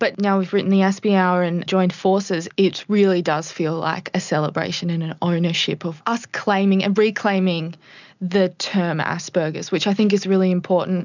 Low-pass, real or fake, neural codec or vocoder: 7.2 kHz; real; none